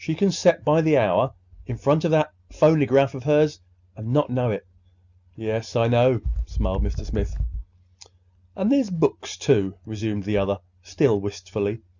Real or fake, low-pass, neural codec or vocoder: real; 7.2 kHz; none